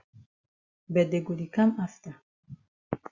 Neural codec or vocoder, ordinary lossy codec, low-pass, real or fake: none; Opus, 64 kbps; 7.2 kHz; real